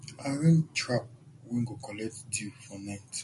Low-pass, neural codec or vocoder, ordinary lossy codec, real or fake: 14.4 kHz; none; MP3, 48 kbps; real